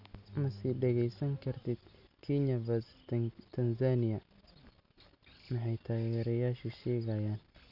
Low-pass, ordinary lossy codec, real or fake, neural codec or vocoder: 5.4 kHz; none; real; none